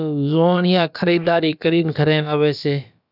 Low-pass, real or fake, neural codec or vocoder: 5.4 kHz; fake; codec, 16 kHz, about 1 kbps, DyCAST, with the encoder's durations